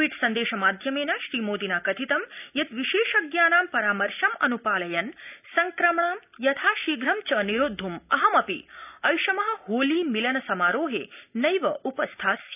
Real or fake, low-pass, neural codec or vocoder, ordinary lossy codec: real; 3.6 kHz; none; none